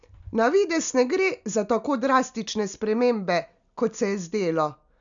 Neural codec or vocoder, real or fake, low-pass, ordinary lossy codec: none; real; 7.2 kHz; none